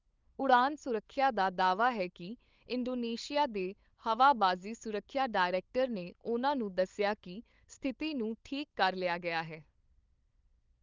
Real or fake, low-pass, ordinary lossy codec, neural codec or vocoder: fake; 7.2 kHz; Opus, 24 kbps; codec, 16 kHz, 8 kbps, FunCodec, trained on LibriTTS, 25 frames a second